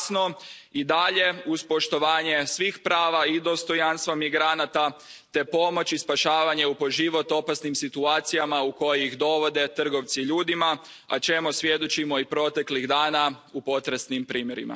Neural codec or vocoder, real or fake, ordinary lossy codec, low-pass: none; real; none; none